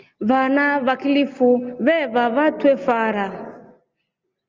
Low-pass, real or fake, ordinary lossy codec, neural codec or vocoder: 7.2 kHz; real; Opus, 24 kbps; none